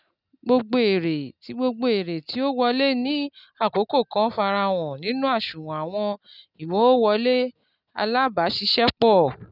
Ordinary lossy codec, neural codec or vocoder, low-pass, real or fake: none; none; 5.4 kHz; real